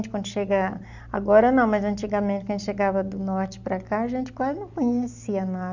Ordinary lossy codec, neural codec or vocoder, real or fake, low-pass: none; none; real; 7.2 kHz